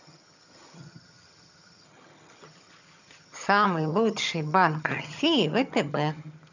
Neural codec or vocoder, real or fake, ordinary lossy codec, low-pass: vocoder, 22.05 kHz, 80 mel bands, HiFi-GAN; fake; none; 7.2 kHz